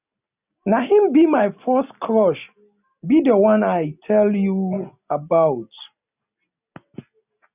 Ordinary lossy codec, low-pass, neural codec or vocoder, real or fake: none; 3.6 kHz; none; real